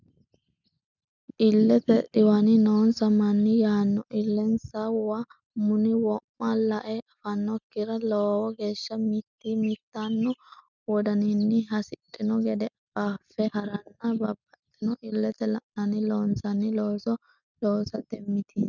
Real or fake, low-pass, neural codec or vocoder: real; 7.2 kHz; none